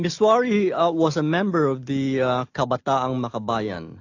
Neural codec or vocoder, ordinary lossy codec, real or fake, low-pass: none; AAC, 48 kbps; real; 7.2 kHz